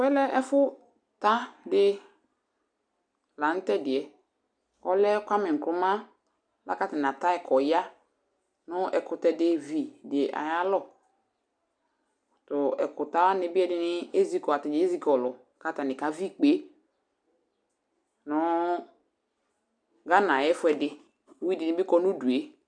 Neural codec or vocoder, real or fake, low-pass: none; real; 9.9 kHz